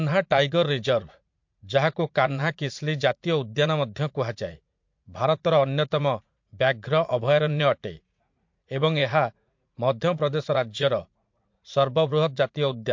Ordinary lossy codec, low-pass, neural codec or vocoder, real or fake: MP3, 48 kbps; 7.2 kHz; vocoder, 44.1 kHz, 80 mel bands, Vocos; fake